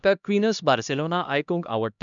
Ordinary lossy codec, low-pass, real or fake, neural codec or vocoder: none; 7.2 kHz; fake; codec, 16 kHz, 1 kbps, X-Codec, HuBERT features, trained on LibriSpeech